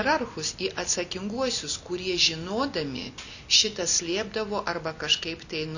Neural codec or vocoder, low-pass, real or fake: none; 7.2 kHz; real